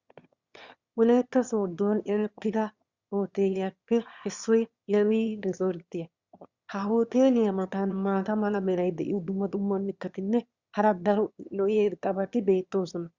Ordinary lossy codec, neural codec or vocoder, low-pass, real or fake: Opus, 64 kbps; autoencoder, 22.05 kHz, a latent of 192 numbers a frame, VITS, trained on one speaker; 7.2 kHz; fake